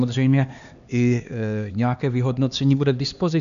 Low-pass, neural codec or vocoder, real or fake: 7.2 kHz; codec, 16 kHz, 2 kbps, X-Codec, HuBERT features, trained on LibriSpeech; fake